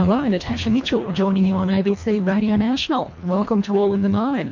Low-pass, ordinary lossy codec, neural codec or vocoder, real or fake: 7.2 kHz; MP3, 48 kbps; codec, 24 kHz, 1.5 kbps, HILCodec; fake